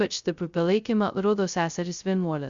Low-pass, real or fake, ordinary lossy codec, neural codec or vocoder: 7.2 kHz; fake; Opus, 64 kbps; codec, 16 kHz, 0.2 kbps, FocalCodec